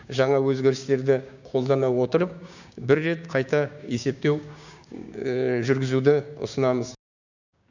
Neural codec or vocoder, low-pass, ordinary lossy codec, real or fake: codec, 16 kHz, 6 kbps, DAC; 7.2 kHz; none; fake